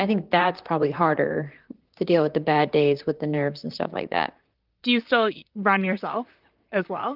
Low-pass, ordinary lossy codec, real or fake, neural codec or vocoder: 5.4 kHz; Opus, 32 kbps; fake; vocoder, 44.1 kHz, 128 mel bands, Pupu-Vocoder